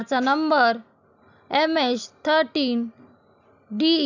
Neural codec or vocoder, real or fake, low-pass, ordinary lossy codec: none; real; 7.2 kHz; none